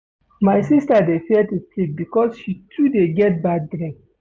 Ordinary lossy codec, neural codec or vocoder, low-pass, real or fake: none; none; none; real